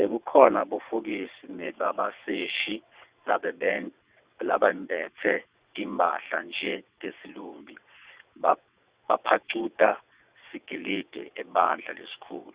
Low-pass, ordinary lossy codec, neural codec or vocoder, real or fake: 3.6 kHz; Opus, 64 kbps; vocoder, 22.05 kHz, 80 mel bands, WaveNeXt; fake